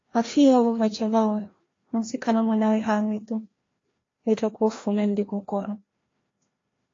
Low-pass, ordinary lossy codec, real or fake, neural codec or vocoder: 7.2 kHz; AAC, 32 kbps; fake; codec, 16 kHz, 1 kbps, FreqCodec, larger model